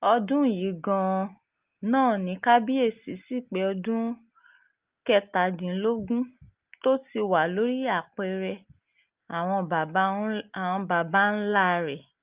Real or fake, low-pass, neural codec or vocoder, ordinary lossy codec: real; 3.6 kHz; none; Opus, 24 kbps